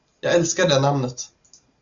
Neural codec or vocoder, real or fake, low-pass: none; real; 7.2 kHz